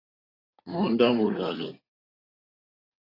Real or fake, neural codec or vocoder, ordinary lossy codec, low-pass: fake; codec, 16 kHz in and 24 kHz out, 2.2 kbps, FireRedTTS-2 codec; AAC, 24 kbps; 5.4 kHz